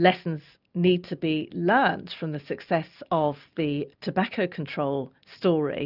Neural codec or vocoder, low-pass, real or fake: none; 5.4 kHz; real